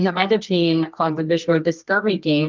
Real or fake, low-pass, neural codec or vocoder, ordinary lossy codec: fake; 7.2 kHz; codec, 24 kHz, 0.9 kbps, WavTokenizer, medium music audio release; Opus, 24 kbps